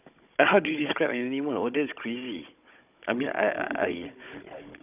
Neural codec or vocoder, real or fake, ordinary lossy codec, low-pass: codec, 16 kHz, 16 kbps, FunCodec, trained on Chinese and English, 50 frames a second; fake; none; 3.6 kHz